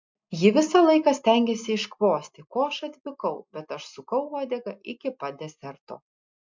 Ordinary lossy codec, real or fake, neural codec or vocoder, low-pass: MP3, 64 kbps; real; none; 7.2 kHz